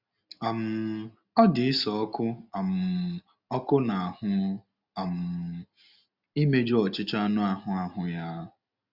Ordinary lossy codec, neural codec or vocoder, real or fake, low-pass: Opus, 64 kbps; none; real; 5.4 kHz